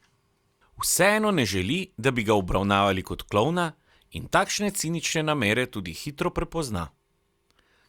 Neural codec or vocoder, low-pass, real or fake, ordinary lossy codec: none; 19.8 kHz; real; Opus, 64 kbps